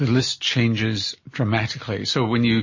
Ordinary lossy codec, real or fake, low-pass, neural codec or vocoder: MP3, 32 kbps; real; 7.2 kHz; none